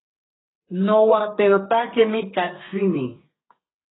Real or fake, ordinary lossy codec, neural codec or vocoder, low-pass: fake; AAC, 16 kbps; codec, 32 kHz, 1.9 kbps, SNAC; 7.2 kHz